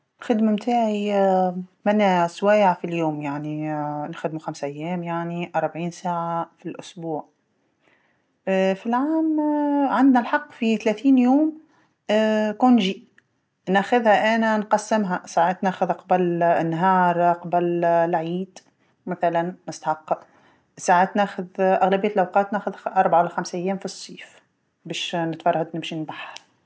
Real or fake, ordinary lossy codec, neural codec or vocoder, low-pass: real; none; none; none